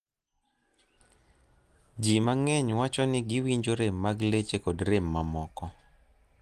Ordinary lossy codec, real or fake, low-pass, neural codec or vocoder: Opus, 24 kbps; real; 14.4 kHz; none